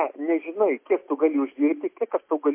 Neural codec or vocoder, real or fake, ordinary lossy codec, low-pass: none; real; MP3, 24 kbps; 3.6 kHz